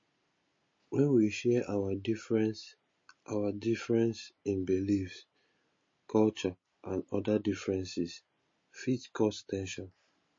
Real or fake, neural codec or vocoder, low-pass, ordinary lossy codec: real; none; 7.2 kHz; MP3, 32 kbps